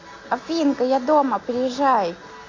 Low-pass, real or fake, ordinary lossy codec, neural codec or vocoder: 7.2 kHz; real; AAC, 32 kbps; none